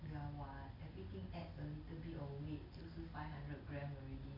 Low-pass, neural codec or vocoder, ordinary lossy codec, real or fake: 5.4 kHz; none; none; real